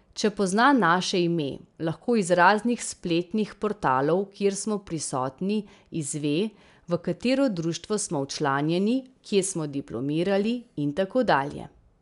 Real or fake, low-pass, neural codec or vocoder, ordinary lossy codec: real; 10.8 kHz; none; none